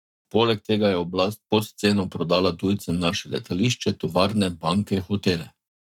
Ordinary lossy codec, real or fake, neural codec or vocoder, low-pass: none; fake; codec, 44.1 kHz, 7.8 kbps, Pupu-Codec; 19.8 kHz